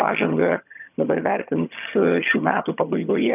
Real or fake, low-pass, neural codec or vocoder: fake; 3.6 kHz; vocoder, 22.05 kHz, 80 mel bands, HiFi-GAN